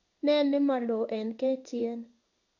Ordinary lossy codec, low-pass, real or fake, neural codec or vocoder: none; 7.2 kHz; fake; codec, 16 kHz in and 24 kHz out, 1 kbps, XY-Tokenizer